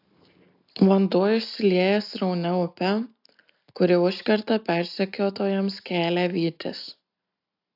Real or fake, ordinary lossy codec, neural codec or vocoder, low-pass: real; AAC, 48 kbps; none; 5.4 kHz